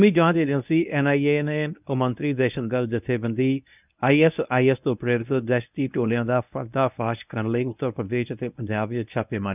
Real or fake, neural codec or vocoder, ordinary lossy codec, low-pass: fake; codec, 24 kHz, 0.9 kbps, WavTokenizer, medium speech release version 1; none; 3.6 kHz